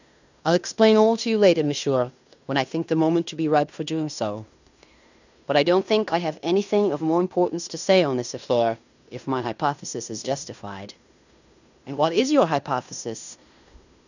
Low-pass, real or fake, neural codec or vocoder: 7.2 kHz; fake; codec, 16 kHz in and 24 kHz out, 0.9 kbps, LongCat-Audio-Codec, fine tuned four codebook decoder